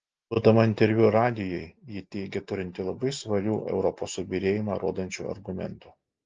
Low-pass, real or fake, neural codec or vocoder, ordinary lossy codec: 7.2 kHz; real; none; Opus, 24 kbps